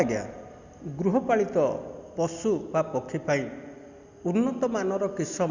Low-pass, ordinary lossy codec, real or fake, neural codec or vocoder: 7.2 kHz; Opus, 64 kbps; real; none